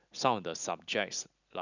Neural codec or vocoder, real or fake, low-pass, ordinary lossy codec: none; real; 7.2 kHz; none